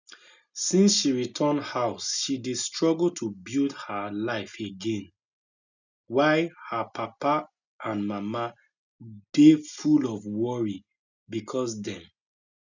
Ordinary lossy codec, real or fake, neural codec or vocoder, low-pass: none; real; none; 7.2 kHz